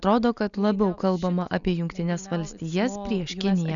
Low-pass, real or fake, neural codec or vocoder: 7.2 kHz; real; none